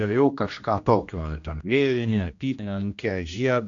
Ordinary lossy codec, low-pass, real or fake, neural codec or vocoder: AAC, 64 kbps; 7.2 kHz; fake; codec, 16 kHz, 1 kbps, X-Codec, HuBERT features, trained on general audio